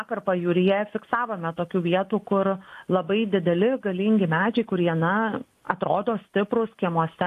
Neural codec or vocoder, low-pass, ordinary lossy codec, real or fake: none; 14.4 kHz; AAC, 64 kbps; real